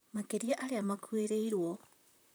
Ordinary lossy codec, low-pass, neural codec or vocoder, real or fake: none; none; vocoder, 44.1 kHz, 128 mel bands, Pupu-Vocoder; fake